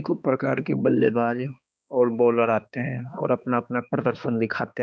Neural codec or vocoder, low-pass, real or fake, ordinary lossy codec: codec, 16 kHz, 2 kbps, X-Codec, HuBERT features, trained on balanced general audio; none; fake; none